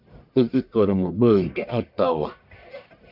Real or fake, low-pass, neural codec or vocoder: fake; 5.4 kHz; codec, 44.1 kHz, 1.7 kbps, Pupu-Codec